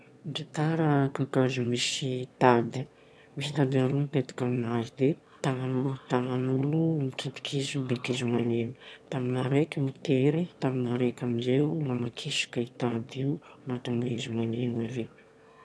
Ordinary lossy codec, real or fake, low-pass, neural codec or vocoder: none; fake; none; autoencoder, 22.05 kHz, a latent of 192 numbers a frame, VITS, trained on one speaker